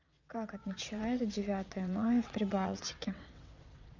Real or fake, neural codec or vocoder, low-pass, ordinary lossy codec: fake; vocoder, 22.05 kHz, 80 mel bands, WaveNeXt; 7.2 kHz; none